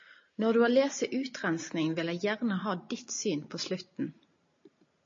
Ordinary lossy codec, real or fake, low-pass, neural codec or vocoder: MP3, 32 kbps; real; 7.2 kHz; none